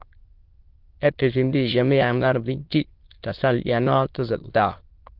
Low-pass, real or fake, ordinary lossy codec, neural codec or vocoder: 5.4 kHz; fake; Opus, 16 kbps; autoencoder, 22.05 kHz, a latent of 192 numbers a frame, VITS, trained on many speakers